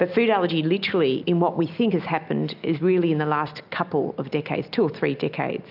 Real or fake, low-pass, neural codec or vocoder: real; 5.4 kHz; none